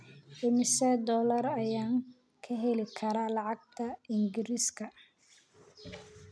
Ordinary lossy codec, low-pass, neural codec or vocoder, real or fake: none; none; none; real